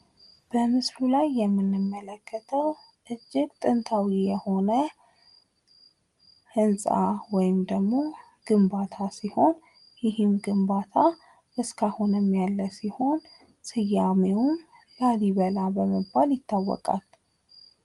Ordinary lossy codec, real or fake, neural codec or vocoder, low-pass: Opus, 32 kbps; real; none; 10.8 kHz